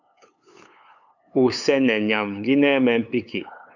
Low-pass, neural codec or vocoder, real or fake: 7.2 kHz; codec, 16 kHz, 8 kbps, FunCodec, trained on LibriTTS, 25 frames a second; fake